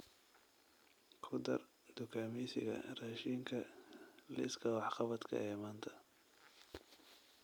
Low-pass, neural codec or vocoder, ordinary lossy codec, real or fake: none; none; none; real